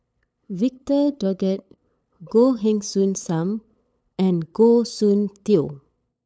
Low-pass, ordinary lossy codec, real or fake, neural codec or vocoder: none; none; fake; codec, 16 kHz, 8 kbps, FunCodec, trained on LibriTTS, 25 frames a second